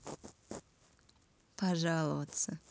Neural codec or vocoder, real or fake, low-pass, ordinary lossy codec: none; real; none; none